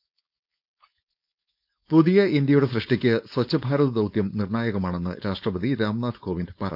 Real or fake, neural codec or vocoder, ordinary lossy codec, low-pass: fake; codec, 16 kHz, 4.8 kbps, FACodec; none; 5.4 kHz